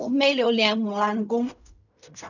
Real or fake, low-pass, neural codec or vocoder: fake; 7.2 kHz; codec, 16 kHz in and 24 kHz out, 0.4 kbps, LongCat-Audio-Codec, fine tuned four codebook decoder